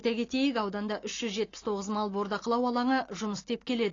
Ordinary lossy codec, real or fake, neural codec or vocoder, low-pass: AAC, 32 kbps; real; none; 7.2 kHz